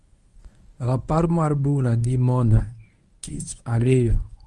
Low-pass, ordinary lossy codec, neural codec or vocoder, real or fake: 10.8 kHz; Opus, 32 kbps; codec, 24 kHz, 0.9 kbps, WavTokenizer, medium speech release version 1; fake